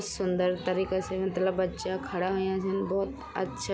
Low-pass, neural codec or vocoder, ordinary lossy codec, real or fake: none; none; none; real